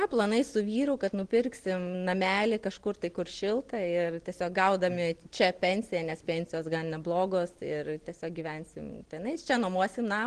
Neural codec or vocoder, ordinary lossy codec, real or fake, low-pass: none; Opus, 16 kbps; real; 9.9 kHz